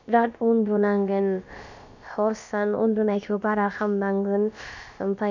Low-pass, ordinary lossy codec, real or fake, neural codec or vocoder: 7.2 kHz; none; fake; codec, 16 kHz, about 1 kbps, DyCAST, with the encoder's durations